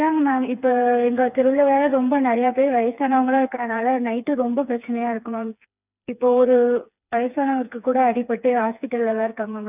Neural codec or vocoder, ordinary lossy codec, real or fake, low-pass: codec, 16 kHz, 4 kbps, FreqCodec, smaller model; none; fake; 3.6 kHz